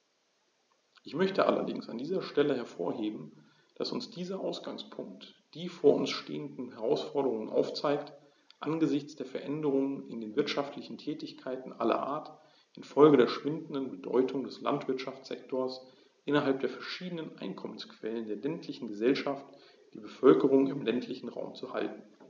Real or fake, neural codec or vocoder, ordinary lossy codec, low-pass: real; none; none; 7.2 kHz